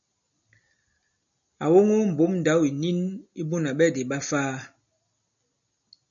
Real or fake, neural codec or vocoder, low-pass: real; none; 7.2 kHz